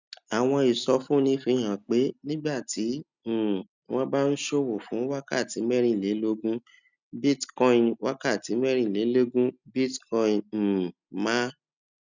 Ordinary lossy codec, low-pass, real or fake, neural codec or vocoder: none; 7.2 kHz; real; none